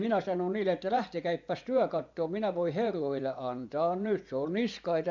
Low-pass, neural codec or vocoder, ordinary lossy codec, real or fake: 7.2 kHz; vocoder, 24 kHz, 100 mel bands, Vocos; MP3, 64 kbps; fake